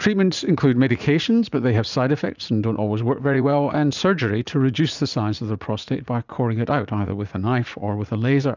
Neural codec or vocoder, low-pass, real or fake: vocoder, 44.1 kHz, 80 mel bands, Vocos; 7.2 kHz; fake